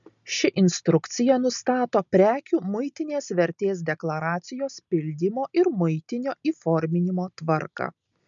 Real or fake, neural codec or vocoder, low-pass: real; none; 7.2 kHz